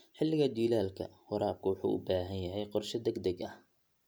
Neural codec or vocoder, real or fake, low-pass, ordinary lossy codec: none; real; none; none